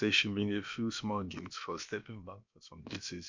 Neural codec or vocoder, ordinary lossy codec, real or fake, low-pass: codec, 16 kHz, about 1 kbps, DyCAST, with the encoder's durations; MP3, 64 kbps; fake; 7.2 kHz